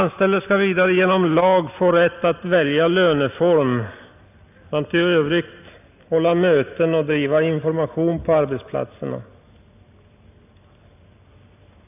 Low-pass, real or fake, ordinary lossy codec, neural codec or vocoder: 3.6 kHz; real; none; none